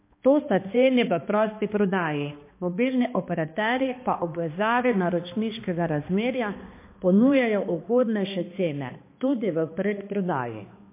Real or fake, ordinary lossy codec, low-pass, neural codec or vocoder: fake; MP3, 24 kbps; 3.6 kHz; codec, 16 kHz, 2 kbps, X-Codec, HuBERT features, trained on balanced general audio